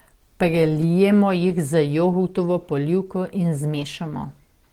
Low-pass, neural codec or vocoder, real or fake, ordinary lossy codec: 19.8 kHz; none; real; Opus, 16 kbps